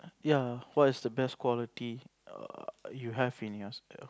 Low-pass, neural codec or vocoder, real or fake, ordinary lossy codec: none; none; real; none